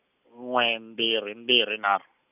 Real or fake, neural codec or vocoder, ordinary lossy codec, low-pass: real; none; none; 3.6 kHz